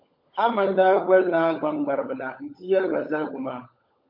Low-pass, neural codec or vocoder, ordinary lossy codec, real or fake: 5.4 kHz; codec, 16 kHz, 16 kbps, FunCodec, trained on LibriTTS, 50 frames a second; MP3, 32 kbps; fake